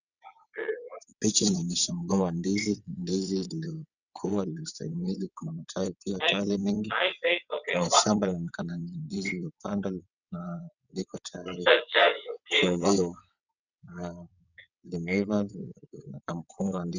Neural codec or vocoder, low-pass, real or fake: vocoder, 22.05 kHz, 80 mel bands, WaveNeXt; 7.2 kHz; fake